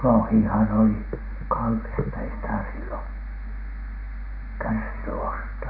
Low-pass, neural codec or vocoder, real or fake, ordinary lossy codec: 5.4 kHz; none; real; none